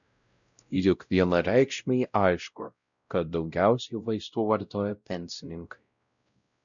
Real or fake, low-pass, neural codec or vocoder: fake; 7.2 kHz; codec, 16 kHz, 0.5 kbps, X-Codec, WavLM features, trained on Multilingual LibriSpeech